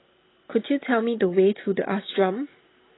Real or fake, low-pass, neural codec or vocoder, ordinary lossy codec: real; 7.2 kHz; none; AAC, 16 kbps